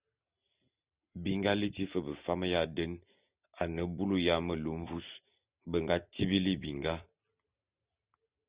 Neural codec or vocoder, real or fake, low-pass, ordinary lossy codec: none; real; 3.6 kHz; Opus, 64 kbps